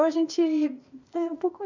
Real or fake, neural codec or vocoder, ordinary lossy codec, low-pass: fake; codec, 16 kHz, 0.8 kbps, ZipCodec; MP3, 64 kbps; 7.2 kHz